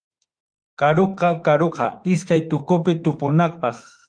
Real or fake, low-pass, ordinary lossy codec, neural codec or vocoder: fake; 9.9 kHz; Opus, 32 kbps; autoencoder, 48 kHz, 32 numbers a frame, DAC-VAE, trained on Japanese speech